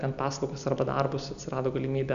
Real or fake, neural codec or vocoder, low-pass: real; none; 7.2 kHz